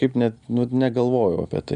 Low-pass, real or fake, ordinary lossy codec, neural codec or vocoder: 9.9 kHz; real; MP3, 96 kbps; none